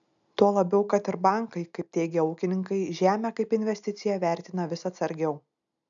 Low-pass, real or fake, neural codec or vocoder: 7.2 kHz; real; none